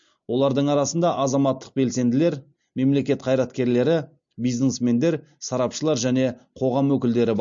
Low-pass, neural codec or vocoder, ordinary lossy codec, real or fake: 7.2 kHz; none; MP3, 48 kbps; real